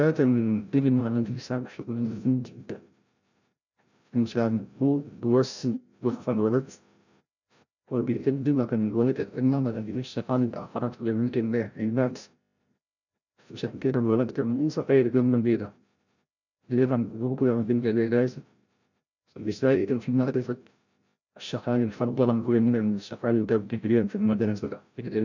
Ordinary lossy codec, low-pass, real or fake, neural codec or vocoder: none; 7.2 kHz; fake; codec, 16 kHz, 0.5 kbps, FreqCodec, larger model